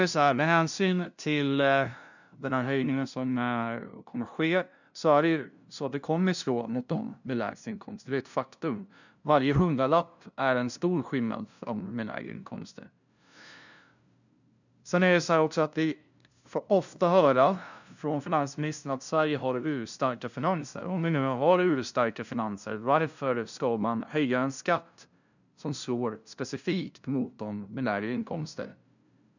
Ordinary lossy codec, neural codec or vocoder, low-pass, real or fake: none; codec, 16 kHz, 0.5 kbps, FunCodec, trained on LibriTTS, 25 frames a second; 7.2 kHz; fake